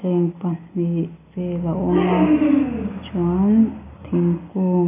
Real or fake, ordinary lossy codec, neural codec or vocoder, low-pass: real; none; none; 3.6 kHz